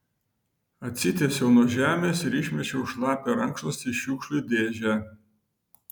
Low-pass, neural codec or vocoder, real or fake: 19.8 kHz; none; real